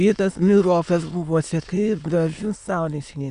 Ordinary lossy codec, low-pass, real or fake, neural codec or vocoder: Opus, 64 kbps; 9.9 kHz; fake; autoencoder, 22.05 kHz, a latent of 192 numbers a frame, VITS, trained on many speakers